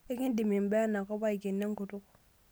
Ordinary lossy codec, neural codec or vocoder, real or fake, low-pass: none; none; real; none